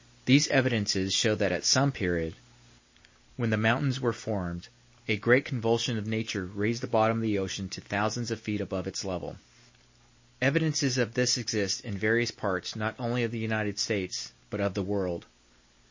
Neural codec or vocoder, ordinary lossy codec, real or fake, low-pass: none; MP3, 32 kbps; real; 7.2 kHz